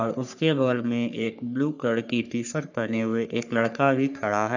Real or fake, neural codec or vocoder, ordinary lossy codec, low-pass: fake; codec, 44.1 kHz, 3.4 kbps, Pupu-Codec; none; 7.2 kHz